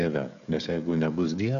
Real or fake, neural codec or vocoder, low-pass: fake; codec, 16 kHz, 16 kbps, FreqCodec, smaller model; 7.2 kHz